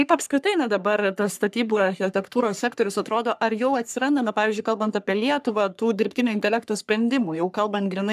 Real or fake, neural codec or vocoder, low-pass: fake; codec, 44.1 kHz, 3.4 kbps, Pupu-Codec; 14.4 kHz